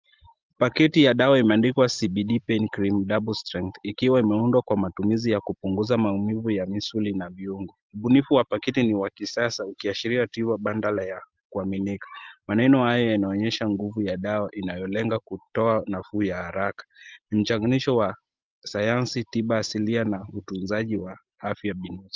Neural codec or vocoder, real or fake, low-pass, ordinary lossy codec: none; real; 7.2 kHz; Opus, 24 kbps